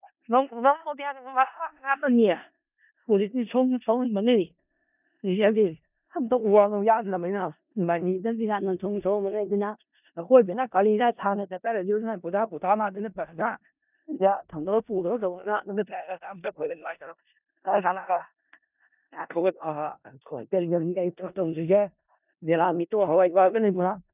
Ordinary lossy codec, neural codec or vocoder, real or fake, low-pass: none; codec, 16 kHz in and 24 kHz out, 0.4 kbps, LongCat-Audio-Codec, four codebook decoder; fake; 3.6 kHz